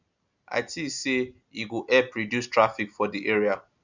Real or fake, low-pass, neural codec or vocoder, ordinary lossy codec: real; 7.2 kHz; none; none